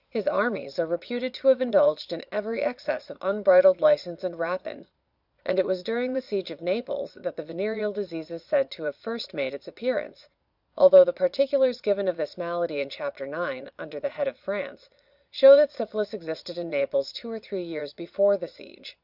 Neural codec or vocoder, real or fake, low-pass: vocoder, 22.05 kHz, 80 mel bands, Vocos; fake; 5.4 kHz